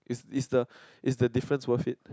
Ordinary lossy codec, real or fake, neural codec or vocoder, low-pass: none; real; none; none